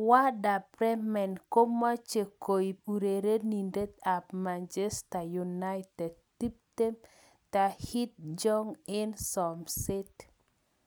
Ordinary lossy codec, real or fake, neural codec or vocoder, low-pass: none; real; none; none